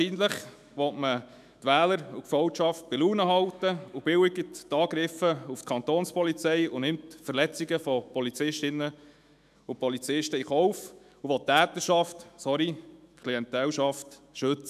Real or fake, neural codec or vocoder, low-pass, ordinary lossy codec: fake; autoencoder, 48 kHz, 128 numbers a frame, DAC-VAE, trained on Japanese speech; 14.4 kHz; none